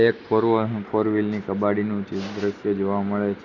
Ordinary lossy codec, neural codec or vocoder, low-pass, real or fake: none; none; 7.2 kHz; real